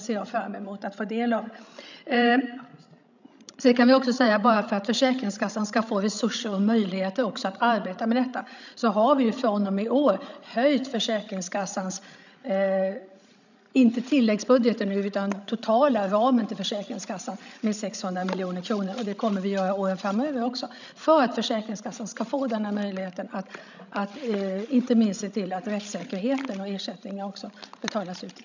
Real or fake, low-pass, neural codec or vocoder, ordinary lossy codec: fake; 7.2 kHz; codec, 16 kHz, 16 kbps, FreqCodec, larger model; none